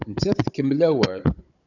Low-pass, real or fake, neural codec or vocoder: 7.2 kHz; fake; codec, 16 kHz, 16 kbps, FunCodec, trained on Chinese and English, 50 frames a second